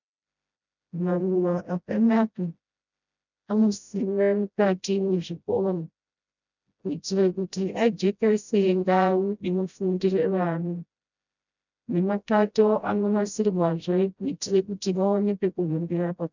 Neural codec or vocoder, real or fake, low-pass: codec, 16 kHz, 0.5 kbps, FreqCodec, smaller model; fake; 7.2 kHz